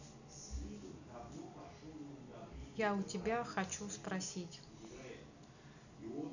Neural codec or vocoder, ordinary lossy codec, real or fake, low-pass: none; none; real; 7.2 kHz